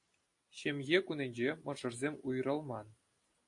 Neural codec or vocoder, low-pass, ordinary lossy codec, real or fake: none; 10.8 kHz; AAC, 48 kbps; real